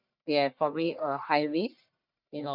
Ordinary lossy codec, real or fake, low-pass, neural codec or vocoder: none; fake; 5.4 kHz; codec, 44.1 kHz, 1.7 kbps, Pupu-Codec